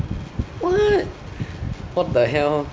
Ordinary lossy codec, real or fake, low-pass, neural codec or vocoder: none; real; none; none